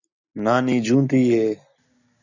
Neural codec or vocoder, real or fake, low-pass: none; real; 7.2 kHz